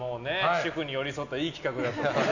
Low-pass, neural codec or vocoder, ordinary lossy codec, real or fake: 7.2 kHz; none; none; real